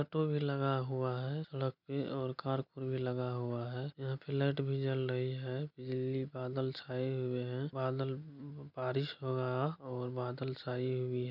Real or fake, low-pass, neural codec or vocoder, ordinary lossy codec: real; 5.4 kHz; none; none